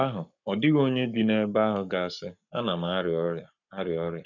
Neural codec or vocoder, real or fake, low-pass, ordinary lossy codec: codec, 44.1 kHz, 7.8 kbps, Pupu-Codec; fake; 7.2 kHz; none